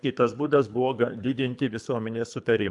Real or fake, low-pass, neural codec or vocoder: fake; 10.8 kHz; codec, 24 kHz, 3 kbps, HILCodec